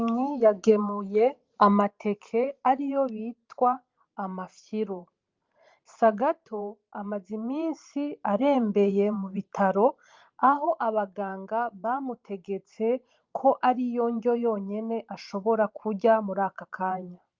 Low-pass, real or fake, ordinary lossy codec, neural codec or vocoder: 7.2 kHz; fake; Opus, 32 kbps; vocoder, 44.1 kHz, 128 mel bands every 512 samples, BigVGAN v2